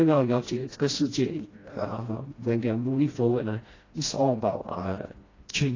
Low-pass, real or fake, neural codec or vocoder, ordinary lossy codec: 7.2 kHz; fake; codec, 16 kHz, 1 kbps, FreqCodec, smaller model; AAC, 32 kbps